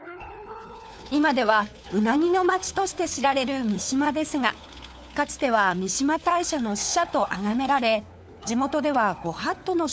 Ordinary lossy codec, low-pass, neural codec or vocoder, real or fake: none; none; codec, 16 kHz, 4 kbps, FunCodec, trained on LibriTTS, 50 frames a second; fake